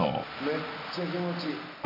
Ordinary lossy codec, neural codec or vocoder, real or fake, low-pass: MP3, 32 kbps; none; real; 5.4 kHz